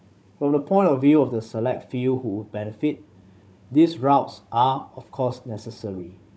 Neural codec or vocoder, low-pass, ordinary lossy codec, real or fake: codec, 16 kHz, 16 kbps, FunCodec, trained on Chinese and English, 50 frames a second; none; none; fake